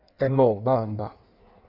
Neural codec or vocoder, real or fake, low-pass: codec, 16 kHz in and 24 kHz out, 1.1 kbps, FireRedTTS-2 codec; fake; 5.4 kHz